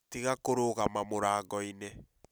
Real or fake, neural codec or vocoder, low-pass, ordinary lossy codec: real; none; none; none